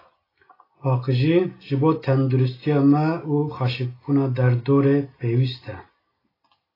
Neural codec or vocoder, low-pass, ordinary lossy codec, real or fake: none; 5.4 kHz; AAC, 24 kbps; real